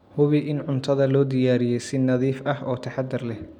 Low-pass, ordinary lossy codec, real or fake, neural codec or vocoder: 19.8 kHz; none; real; none